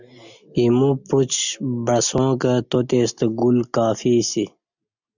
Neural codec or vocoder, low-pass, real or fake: none; 7.2 kHz; real